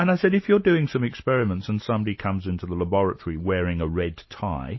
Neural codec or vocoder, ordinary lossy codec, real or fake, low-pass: none; MP3, 24 kbps; real; 7.2 kHz